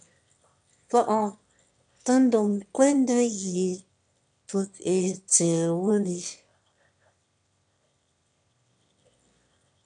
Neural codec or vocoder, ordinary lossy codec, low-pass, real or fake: autoencoder, 22.05 kHz, a latent of 192 numbers a frame, VITS, trained on one speaker; MP3, 64 kbps; 9.9 kHz; fake